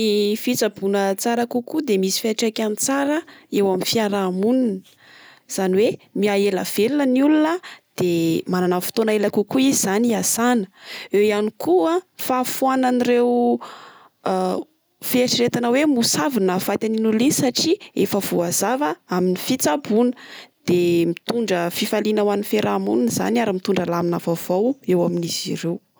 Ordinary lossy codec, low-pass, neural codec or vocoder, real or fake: none; none; none; real